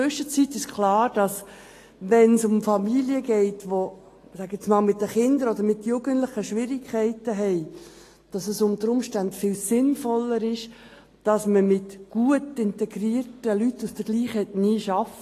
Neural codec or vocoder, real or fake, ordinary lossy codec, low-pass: none; real; AAC, 48 kbps; 14.4 kHz